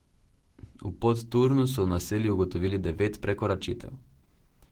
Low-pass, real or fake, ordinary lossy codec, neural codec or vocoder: 19.8 kHz; fake; Opus, 16 kbps; autoencoder, 48 kHz, 128 numbers a frame, DAC-VAE, trained on Japanese speech